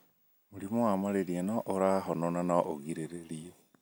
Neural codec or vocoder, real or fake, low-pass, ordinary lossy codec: none; real; none; none